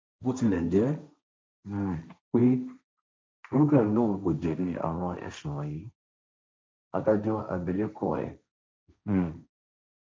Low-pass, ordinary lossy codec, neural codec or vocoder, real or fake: none; none; codec, 16 kHz, 1.1 kbps, Voila-Tokenizer; fake